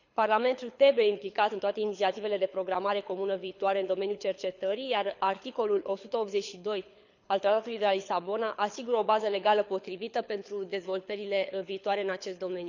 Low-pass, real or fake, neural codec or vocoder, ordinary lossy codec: 7.2 kHz; fake; codec, 24 kHz, 6 kbps, HILCodec; none